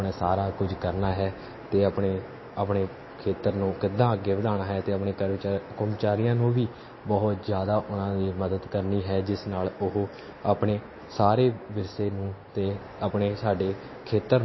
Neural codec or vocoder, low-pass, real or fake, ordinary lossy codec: none; 7.2 kHz; real; MP3, 24 kbps